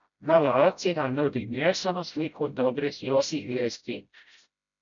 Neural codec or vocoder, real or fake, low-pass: codec, 16 kHz, 0.5 kbps, FreqCodec, smaller model; fake; 7.2 kHz